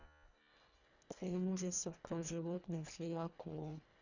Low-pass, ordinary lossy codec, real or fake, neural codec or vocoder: 7.2 kHz; none; fake; codec, 24 kHz, 1.5 kbps, HILCodec